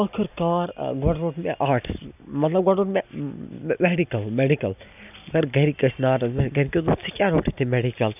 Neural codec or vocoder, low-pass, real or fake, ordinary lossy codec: none; 3.6 kHz; real; none